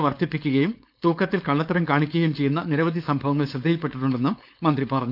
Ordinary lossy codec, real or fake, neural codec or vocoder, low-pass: none; fake; codec, 16 kHz, 4.8 kbps, FACodec; 5.4 kHz